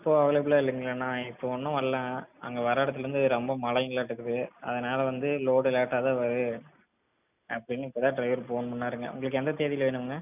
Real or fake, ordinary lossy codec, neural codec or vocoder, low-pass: real; none; none; 3.6 kHz